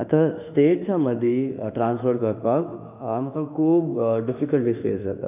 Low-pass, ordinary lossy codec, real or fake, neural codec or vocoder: 3.6 kHz; none; fake; autoencoder, 48 kHz, 32 numbers a frame, DAC-VAE, trained on Japanese speech